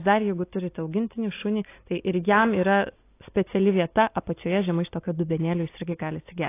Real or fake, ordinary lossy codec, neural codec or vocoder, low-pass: real; AAC, 24 kbps; none; 3.6 kHz